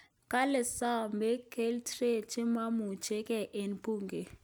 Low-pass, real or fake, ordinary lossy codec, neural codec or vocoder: none; real; none; none